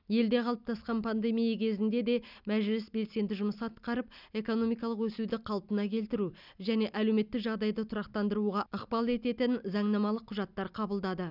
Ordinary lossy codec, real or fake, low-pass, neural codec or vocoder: none; real; 5.4 kHz; none